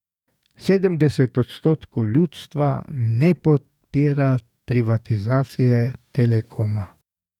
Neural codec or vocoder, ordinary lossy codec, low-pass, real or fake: codec, 44.1 kHz, 2.6 kbps, DAC; none; 19.8 kHz; fake